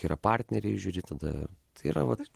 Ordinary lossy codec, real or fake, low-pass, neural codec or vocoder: Opus, 16 kbps; real; 14.4 kHz; none